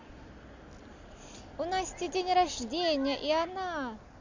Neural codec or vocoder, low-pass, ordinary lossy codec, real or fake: none; 7.2 kHz; Opus, 64 kbps; real